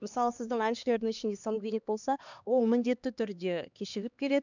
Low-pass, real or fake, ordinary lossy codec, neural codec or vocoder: 7.2 kHz; fake; none; codec, 16 kHz, 2 kbps, X-Codec, HuBERT features, trained on LibriSpeech